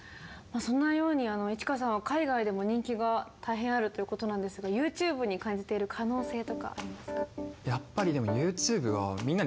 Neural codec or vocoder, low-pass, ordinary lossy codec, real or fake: none; none; none; real